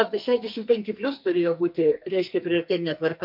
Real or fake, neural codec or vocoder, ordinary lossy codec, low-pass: fake; codec, 44.1 kHz, 2.6 kbps, SNAC; MP3, 48 kbps; 5.4 kHz